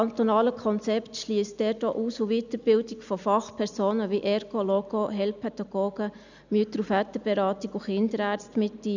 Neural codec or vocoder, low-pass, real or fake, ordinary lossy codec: none; 7.2 kHz; real; none